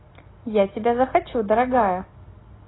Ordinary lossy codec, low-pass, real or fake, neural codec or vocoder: AAC, 16 kbps; 7.2 kHz; real; none